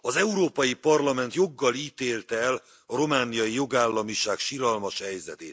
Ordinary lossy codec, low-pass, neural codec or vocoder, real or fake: none; none; none; real